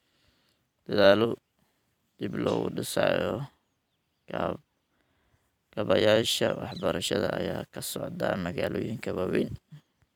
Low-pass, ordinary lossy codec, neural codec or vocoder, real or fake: 19.8 kHz; none; vocoder, 44.1 kHz, 128 mel bands every 256 samples, BigVGAN v2; fake